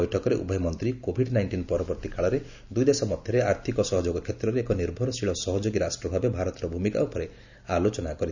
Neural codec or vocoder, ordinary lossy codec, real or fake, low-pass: none; none; real; 7.2 kHz